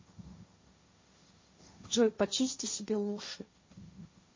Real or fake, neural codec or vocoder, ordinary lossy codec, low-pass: fake; codec, 16 kHz, 1.1 kbps, Voila-Tokenizer; MP3, 32 kbps; 7.2 kHz